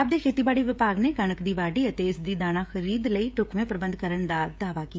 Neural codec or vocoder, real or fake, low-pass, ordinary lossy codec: codec, 16 kHz, 16 kbps, FreqCodec, smaller model; fake; none; none